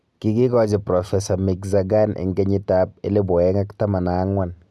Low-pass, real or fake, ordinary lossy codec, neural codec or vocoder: none; real; none; none